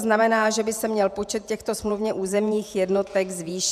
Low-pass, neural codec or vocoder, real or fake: 14.4 kHz; vocoder, 48 kHz, 128 mel bands, Vocos; fake